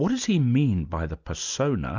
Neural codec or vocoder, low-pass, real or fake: none; 7.2 kHz; real